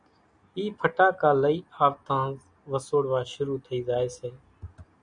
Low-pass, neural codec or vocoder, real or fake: 9.9 kHz; none; real